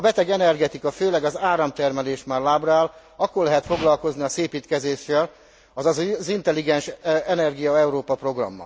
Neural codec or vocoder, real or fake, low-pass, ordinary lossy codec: none; real; none; none